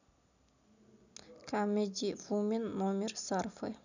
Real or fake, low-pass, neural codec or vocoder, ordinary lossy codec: real; 7.2 kHz; none; none